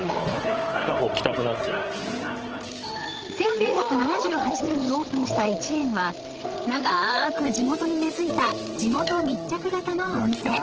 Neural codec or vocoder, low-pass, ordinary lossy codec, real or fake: codec, 16 kHz, 8 kbps, FreqCodec, larger model; 7.2 kHz; Opus, 16 kbps; fake